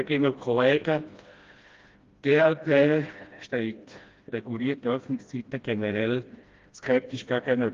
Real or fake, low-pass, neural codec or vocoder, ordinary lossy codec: fake; 7.2 kHz; codec, 16 kHz, 1 kbps, FreqCodec, smaller model; Opus, 32 kbps